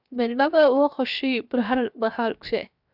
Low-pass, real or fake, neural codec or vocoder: 5.4 kHz; fake; codec, 16 kHz, 0.7 kbps, FocalCodec